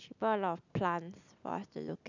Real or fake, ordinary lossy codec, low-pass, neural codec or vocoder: real; none; 7.2 kHz; none